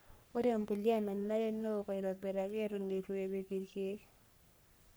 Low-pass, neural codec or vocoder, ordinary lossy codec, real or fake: none; codec, 44.1 kHz, 3.4 kbps, Pupu-Codec; none; fake